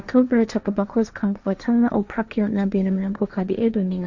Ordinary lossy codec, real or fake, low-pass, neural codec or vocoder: none; fake; 7.2 kHz; codec, 16 kHz, 1.1 kbps, Voila-Tokenizer